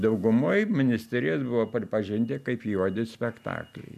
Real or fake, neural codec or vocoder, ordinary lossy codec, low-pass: real; none; MP3, 96 kbps; 14.4 kHz